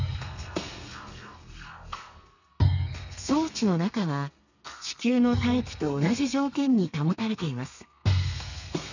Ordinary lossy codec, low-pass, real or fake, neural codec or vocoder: none; 7.2 kHz; fake; codec, 32 kHz, 1.9 kbps, SNAC